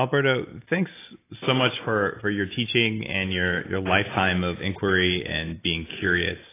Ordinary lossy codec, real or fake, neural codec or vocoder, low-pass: AAC, 16 kbps; real; none; 3.6 kHz